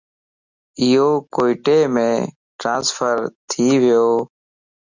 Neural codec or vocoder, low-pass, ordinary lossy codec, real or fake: none; 7.2 kHz; Opus, 64 kbps; real